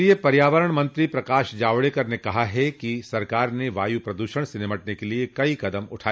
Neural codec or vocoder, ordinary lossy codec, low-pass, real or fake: none; none; none; real